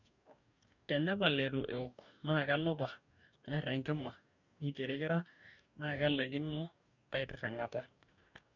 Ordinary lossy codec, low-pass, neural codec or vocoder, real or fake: none; 7.2 kHz; codec, 44.1 kHz, 2.6 kbps, DAC; fake